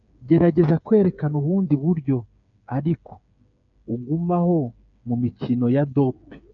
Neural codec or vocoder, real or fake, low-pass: codec, 16 kHz, 8 kbps, FreqCodec, smaller model; fake; 7.2 kHz